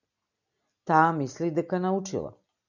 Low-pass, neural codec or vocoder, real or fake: 7.2 kHz; none; real